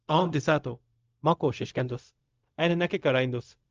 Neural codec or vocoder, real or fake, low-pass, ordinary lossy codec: codec, 16 kHz, 0.4 kbps, LongCat-Audio-Codec; fake; 7.2 kHz; Opus, 24 kbps